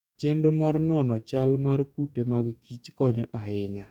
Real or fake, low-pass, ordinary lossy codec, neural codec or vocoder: fake; 19.8 kHz; none; codec, 44.1 kHz, 2.6 kbps, DAC